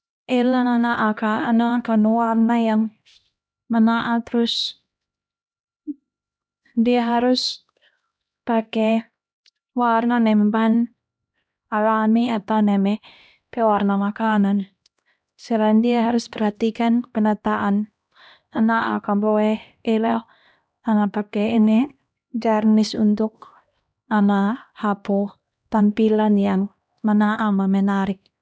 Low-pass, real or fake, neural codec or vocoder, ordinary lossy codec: none; fake; codec, 16 kHz, 1 kbps, X-Codec, HuBERT features, trained on LibriSpeech; none